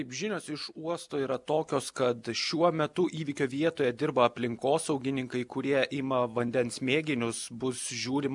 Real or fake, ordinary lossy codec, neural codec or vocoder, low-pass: real; MP3, 96 kbps; none; 10.8 kHz